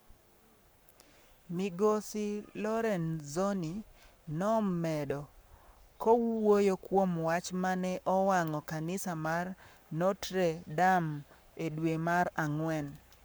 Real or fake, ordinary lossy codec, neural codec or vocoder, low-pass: fake; none; codec, 44.1 kHz, 7.8 kbps, Pupu-Codec; none